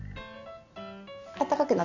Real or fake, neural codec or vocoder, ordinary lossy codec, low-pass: real; none; none; 7.2 kHz